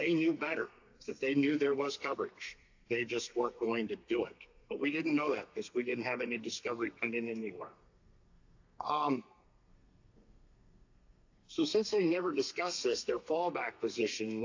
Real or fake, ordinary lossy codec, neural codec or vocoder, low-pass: fake; AAC, 48 kbps; codec, 32 kHz, 1.9 kbps, SNAC; 7.2 kHz